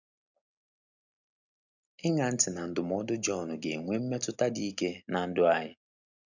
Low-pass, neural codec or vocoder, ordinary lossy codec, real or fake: 7.2 kHz; none; none; real